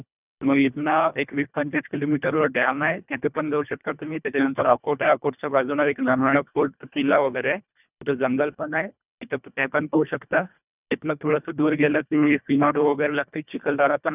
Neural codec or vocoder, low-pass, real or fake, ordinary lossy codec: codec, 24 kHz, 1.5 kbps, HILCodec; 3.6 kHz; fake; none